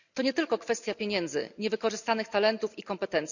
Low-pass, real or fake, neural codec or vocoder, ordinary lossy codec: 7.2 kHz; real; none; none